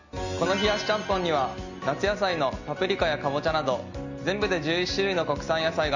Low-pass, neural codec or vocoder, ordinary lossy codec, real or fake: 7.2 kHz; none; none; real